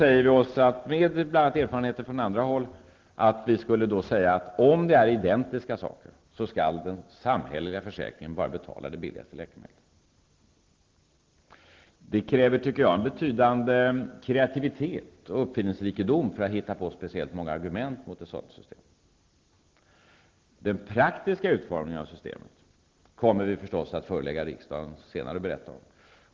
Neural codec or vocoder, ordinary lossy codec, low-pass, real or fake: none; Opus, 16 kbps; 7.2 kHz; real